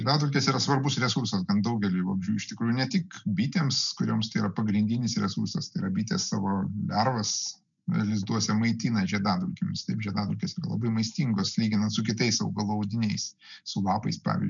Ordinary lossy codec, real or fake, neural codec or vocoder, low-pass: MP3, 96 kbps; real; none; 7.2 kHz